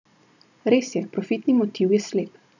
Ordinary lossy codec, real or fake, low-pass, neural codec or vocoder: none; real; 7.2 kHz; none